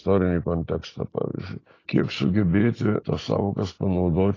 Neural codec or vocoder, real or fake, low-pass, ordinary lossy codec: none; real; 7.2 kHz; AAC, 32 kbps